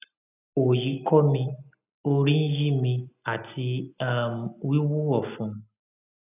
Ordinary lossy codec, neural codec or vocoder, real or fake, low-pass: none; none; real; 3.6 kHz